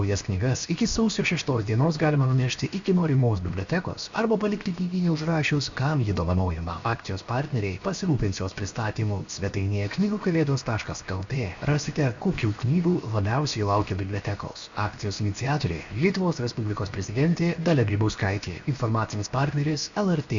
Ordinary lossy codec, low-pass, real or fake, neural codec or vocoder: Opus, 64 kbps; 7.2 kHz; fake; codec, 16 kHz, 0.7 kbps, FocalCodec